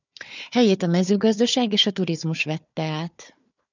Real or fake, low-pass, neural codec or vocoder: fake; 7.2 kHz; codec, 16 kHz, 4 kbps, FunCodec, trained on Chinese and English, 50 frames a second